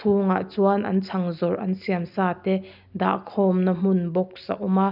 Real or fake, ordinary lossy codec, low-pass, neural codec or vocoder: real; none; 5.4 kHz; none